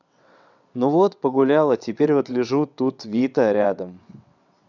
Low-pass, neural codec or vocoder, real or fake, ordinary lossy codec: 7.2 kHz; vocoder, 22.05 kHz, 80 mel bands, WaveNeXt; fake; none